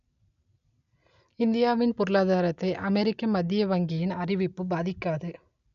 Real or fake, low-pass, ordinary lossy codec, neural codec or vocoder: real; 7.2 kHz; none; none